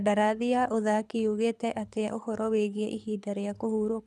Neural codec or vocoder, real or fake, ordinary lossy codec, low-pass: codec, 24 kHz, 6 kbps, HILCodec; fake; none; none